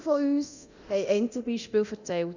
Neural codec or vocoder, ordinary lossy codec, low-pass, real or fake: codec, 24 kHz, 0.9 kbps, DualCodec; Opus, 64 kbps; 7.2 kHz; fake